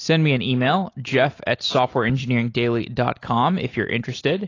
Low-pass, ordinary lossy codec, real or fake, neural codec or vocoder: 7.2 kHz; AAC, 32 kbps; real; none